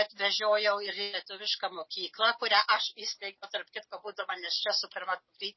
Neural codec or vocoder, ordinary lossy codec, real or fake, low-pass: none; MP3, 24 kbps; real; 7.2 kHz